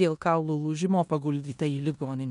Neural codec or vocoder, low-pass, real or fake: codec, 16 kHz in and 24 kHz out, 0.9 kbps, LongCat-Audio-Codec, four codebook decoder; 10.8 kHz; fake